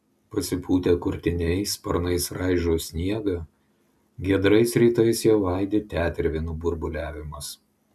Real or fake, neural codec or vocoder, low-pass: real; none; 14.4 kHz